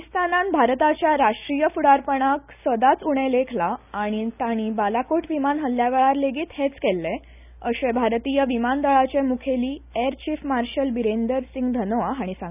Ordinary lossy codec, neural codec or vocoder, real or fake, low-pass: none; none; real; 3.6 kHz